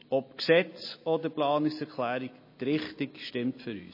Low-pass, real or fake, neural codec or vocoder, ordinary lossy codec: 5.4 kHz; real; none; MP3, 24 kbps